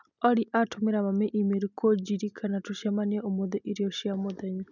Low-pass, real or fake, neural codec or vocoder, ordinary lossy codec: 7.2 kHz; real; none; none